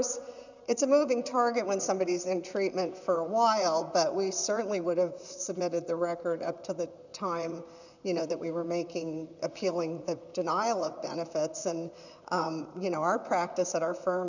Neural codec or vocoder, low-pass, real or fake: vocoder, 44.1 kHz, 128 mel bands, Pupu-Vocoder; 7.2 kHz; fake